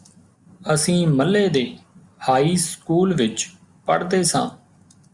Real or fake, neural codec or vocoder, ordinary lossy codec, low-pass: real; none; Opus, 64 kbps; 10.8 kHz